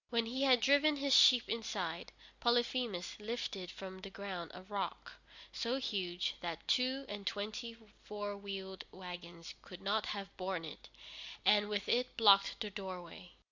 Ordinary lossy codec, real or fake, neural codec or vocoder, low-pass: Opus, 64 kbps; real; none; 7.2 kHz